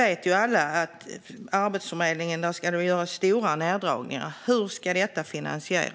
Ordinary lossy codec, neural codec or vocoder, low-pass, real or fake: none; none; none; real